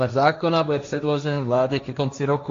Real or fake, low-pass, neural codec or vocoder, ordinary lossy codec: fake; 7.2 kHz; codec, 16 kHz, 1.1 kbps, Voila-Tokenizer; AAC, 48 kbps